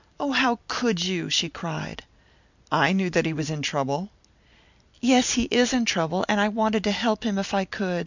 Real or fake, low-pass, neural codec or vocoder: real; 7.2 kHz; none